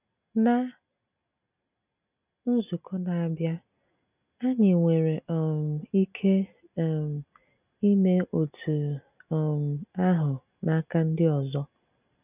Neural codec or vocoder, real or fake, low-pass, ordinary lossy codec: none; real; 3.6 kHz; none